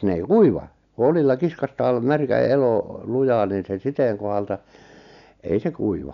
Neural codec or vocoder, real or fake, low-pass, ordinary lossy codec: none; real; 7.2 kHz; none